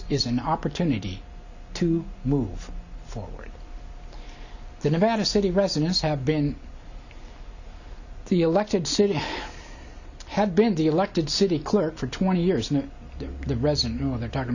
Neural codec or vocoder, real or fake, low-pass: none; real; 7.2 kHz